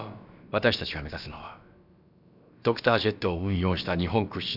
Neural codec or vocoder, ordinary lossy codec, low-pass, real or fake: codec, 16 kHz, about 1 kbps, DyCAST, with the encoder's durations; none; 5.4 kHz; fake